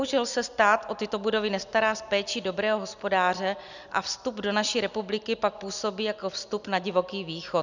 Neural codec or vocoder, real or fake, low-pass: none; real; 7.2 kHz